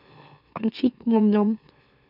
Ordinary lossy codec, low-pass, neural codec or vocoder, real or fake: none; 5.4 kHz; autoencoder, 44.1 kHz, a latent of 192 numbers a frame, MeloTTS; fake